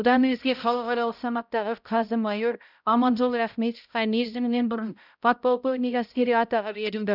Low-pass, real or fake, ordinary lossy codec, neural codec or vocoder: 5.4 kHz; fake; none; codec, 16 kHz, 0.5 kbps, X-Codec, HuBERT features, trained on balanced general audio